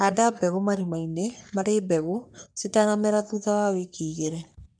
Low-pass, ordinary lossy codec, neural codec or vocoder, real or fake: 9.9 kHz; none; codec, 44.1 kHz, 3.4 kbps, Pupu-Codec; fake